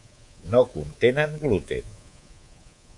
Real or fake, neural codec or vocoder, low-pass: fake; codec, 24 kHz, 3.1 kbps, DualCodec; 10.8 kHz